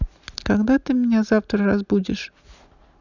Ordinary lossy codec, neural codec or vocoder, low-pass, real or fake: none; none; 7.2 kHz; real